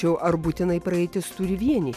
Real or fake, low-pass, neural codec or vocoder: real; 14.4 kHz; none